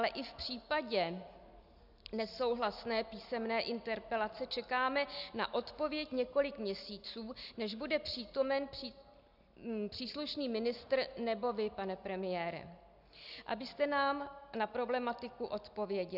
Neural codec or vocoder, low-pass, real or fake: none; 5.4 kHz; real